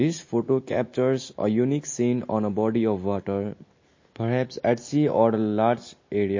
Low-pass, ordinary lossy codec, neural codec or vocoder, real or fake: 7.2 kHz; MP3, 32 kbps; none; real